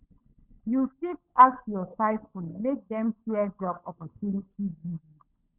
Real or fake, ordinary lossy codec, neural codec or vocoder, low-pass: fake; none; codec, 16 kHz, 16 kbps, FunCodec, trained on LibriTTS, 50 frames a second; 3.6 kHz